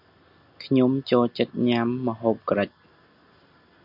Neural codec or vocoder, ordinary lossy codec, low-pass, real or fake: none; MP3, 48 kbps; 5.4 kHz; real